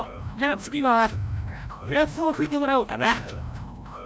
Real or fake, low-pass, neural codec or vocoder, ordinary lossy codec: fake; none; codec, 16 kHz, 0.5 kbps, FreqCodec, larger model; none